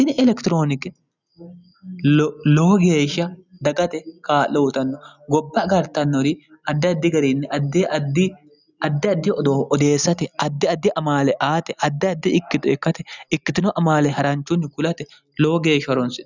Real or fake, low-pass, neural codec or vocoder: real; 7.2 kHz; none